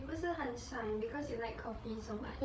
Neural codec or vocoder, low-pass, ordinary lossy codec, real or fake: codec, 16 kHz, 8 kbps, FreqCodec, larger model; none; none; fake